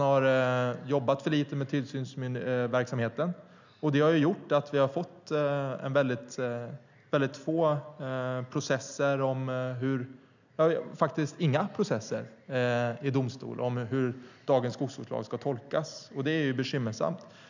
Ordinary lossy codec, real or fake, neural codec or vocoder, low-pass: none; real; none; 7.2 kHz